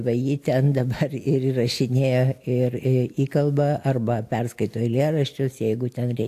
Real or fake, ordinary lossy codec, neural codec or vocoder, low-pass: real; AAC, 64 kbps; none; 14.4 kHz